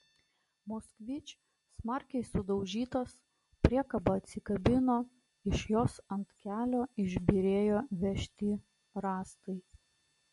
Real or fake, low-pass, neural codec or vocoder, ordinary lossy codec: real; 14.4 kHz; none; MP3, 48 kbps